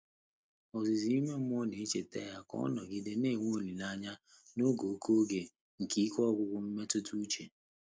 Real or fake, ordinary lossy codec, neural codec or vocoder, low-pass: real; none; none; none